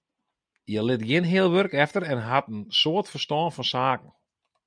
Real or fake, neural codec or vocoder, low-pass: real; none; 9.9 kHz